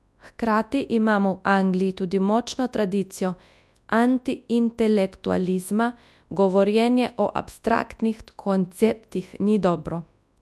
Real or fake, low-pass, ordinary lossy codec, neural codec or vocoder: fake; none; none; codec, 24 kHz, 0.9 kbps, WavTokenizer, large speech release